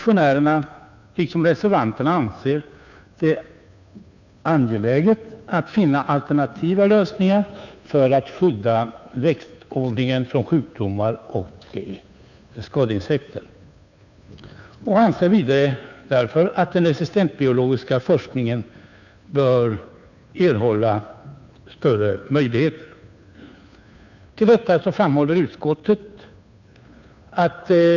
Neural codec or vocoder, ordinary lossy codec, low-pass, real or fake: codec, 16 kHz, 2 kbps, FunCodec, trained on Chinese and English, 25 frames a second; none; 7.2 kHz; fake